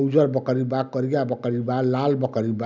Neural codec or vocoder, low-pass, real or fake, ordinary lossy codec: none; 7.2 kHz; real; none